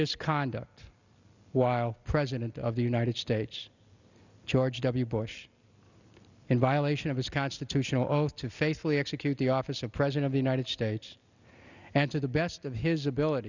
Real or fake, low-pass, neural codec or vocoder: real; 7.2 kHz; none